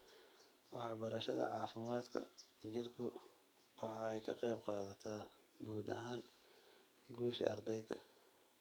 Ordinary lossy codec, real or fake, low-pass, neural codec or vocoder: none; fake; none; codec, 44.1 kHz, 2.6 kbps, SNAC